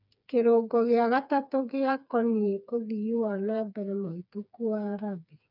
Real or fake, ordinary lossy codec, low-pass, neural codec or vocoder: fake; none; 5.4 kHz; codec, 16 kHz, 4 kbps, FreqCodec, smaller model